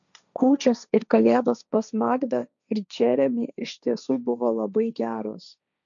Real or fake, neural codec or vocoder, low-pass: fake; codec, 16 kHz, 1.1 kbps, Voila-Tokenizer; 7.2 kHz